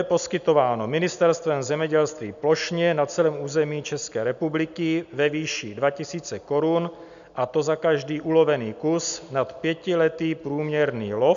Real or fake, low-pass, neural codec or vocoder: real; 7.2 kHz; none